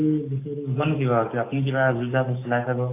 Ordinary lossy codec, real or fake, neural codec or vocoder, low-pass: AAC, 24 kbps; fake; codec, 44.1 kHz, 7.8 kbps, DAC; 3.6 kHz